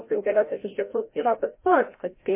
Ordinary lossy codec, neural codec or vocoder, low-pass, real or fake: MP3, 24 kbps; codec, 16 kHz, 0.5 kbps, FreqCodec, larger model; 3.6 kHz; fake